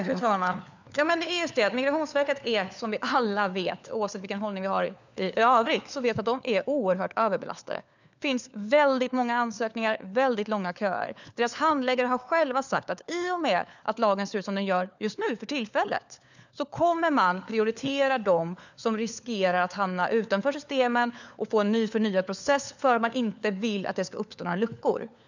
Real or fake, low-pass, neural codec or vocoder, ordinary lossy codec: fake; 7.2 kHz; codec, 16 kHz, 4 kbps, FunCodec, trained on LibriTTS, 50 frames a second; none